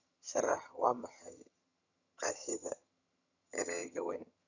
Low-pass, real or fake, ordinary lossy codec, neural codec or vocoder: 7.2 kHz; fake; none; vocoder, 22.05 kHz, 80 mel bands, HiFi-GAN